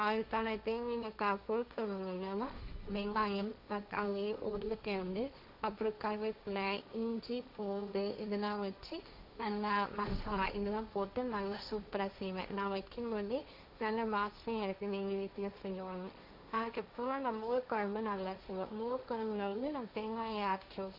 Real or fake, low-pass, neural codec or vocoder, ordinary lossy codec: fake; 5.4 kHz; codec, 16 kHz, 1.1 kbps, Voila-Tokenizer; MP3, 48 kbps